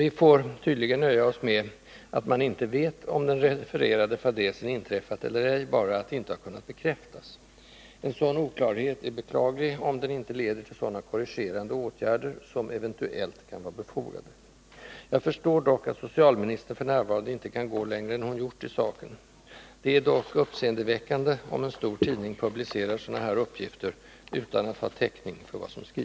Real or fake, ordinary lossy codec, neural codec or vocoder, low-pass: real; none; none; none